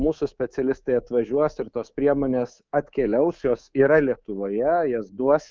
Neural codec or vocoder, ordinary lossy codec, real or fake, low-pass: codec, 24 kHz, 3.1 kbps, DualCodec; Opus, 24 kbps; fake; 7.2 kHz